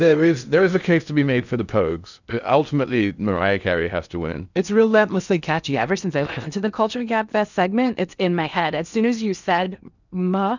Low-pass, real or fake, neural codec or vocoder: 7.2 kHz; fake; codec, 16 kHz in and 24 kHz out, 0.8 kbps, FocalCodec, streaming, 65536 codes